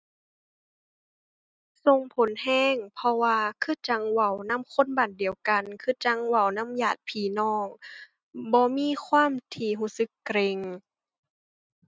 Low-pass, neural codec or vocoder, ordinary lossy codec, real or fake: none; none; none; real